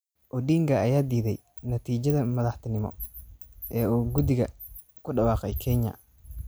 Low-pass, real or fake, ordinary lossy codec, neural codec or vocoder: none; real; none; none